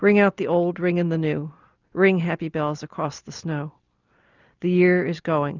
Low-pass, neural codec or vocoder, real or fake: 7.2 kHz; none; real